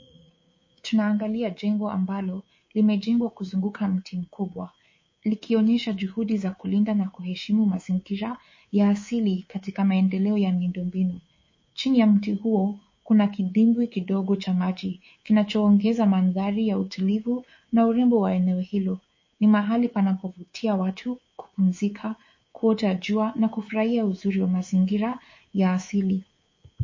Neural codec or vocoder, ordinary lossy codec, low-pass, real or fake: codec, 24 kHz, 3.1 kbps, DualCodec; MP3, 32 kbps; 7.2 kHz; fake